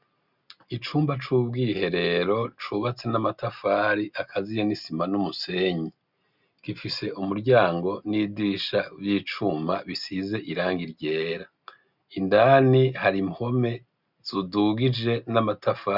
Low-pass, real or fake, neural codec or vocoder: 5.4 kHz; real; none